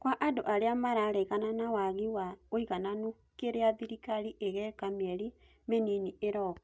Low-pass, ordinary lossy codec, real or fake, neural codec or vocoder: none; none; real; none